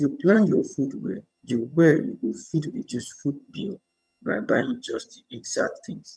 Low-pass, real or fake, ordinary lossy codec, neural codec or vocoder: none; fake; none; vocoder, 22.05 kHz, 80 mel bands, HiFi-GAN